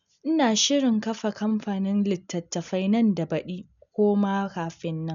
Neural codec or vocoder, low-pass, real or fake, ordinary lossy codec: none; 7.2 kHz; real; none